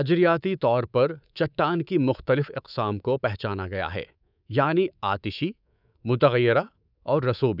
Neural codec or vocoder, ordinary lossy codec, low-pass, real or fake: codec, 24 kHz, 3.1 kbps, DualCodec; none; 5.4 kHz; fake